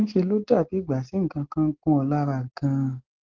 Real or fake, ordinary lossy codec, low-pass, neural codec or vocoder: real; Opus, 16 kbps; 7.2 kHz; none